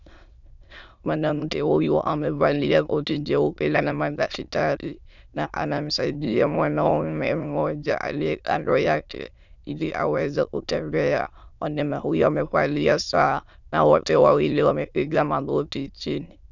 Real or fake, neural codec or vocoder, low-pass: fake; autoencoder, 22.05 kHz, a latent of 192 numbers a frame, VITS, trained on many speakers; 7.2 kHz